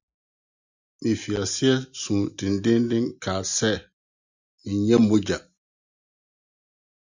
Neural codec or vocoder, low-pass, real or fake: none; 7.2 kHz; real